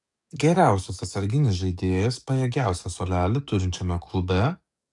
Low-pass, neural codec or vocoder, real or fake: 10.8 kHz; codec, 44.1 kHz, 7.8 kbps, DAC; fake